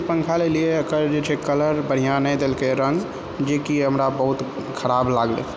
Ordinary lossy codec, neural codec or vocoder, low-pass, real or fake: none; none; none; real